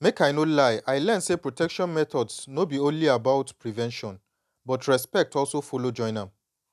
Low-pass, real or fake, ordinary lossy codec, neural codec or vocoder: 14.4 kHz; real; none; none